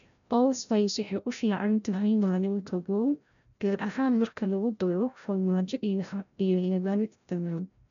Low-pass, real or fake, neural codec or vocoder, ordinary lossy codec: 7.2 kHz; fake; codec, 16 kHz, 0.5 kbps, FreqCodec, larger model; none